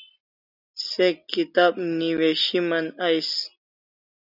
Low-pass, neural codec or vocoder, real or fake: 5.4 kHz; none; real